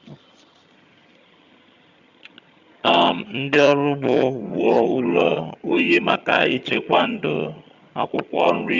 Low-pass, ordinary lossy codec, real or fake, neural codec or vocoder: 7.2 kHz; Opus, 64 kbps; fake; vocoder, 22.05 kHz, 80 mel bands, HiFi-GAN